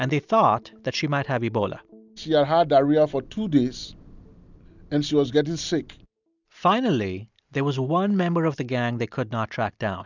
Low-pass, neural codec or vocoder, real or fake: 7.2 kHz; none; real